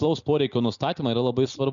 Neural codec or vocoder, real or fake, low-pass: none; real; 7.2 kHz